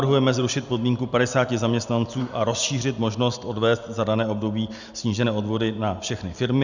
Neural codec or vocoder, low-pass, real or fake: none; 7.2 kHz; real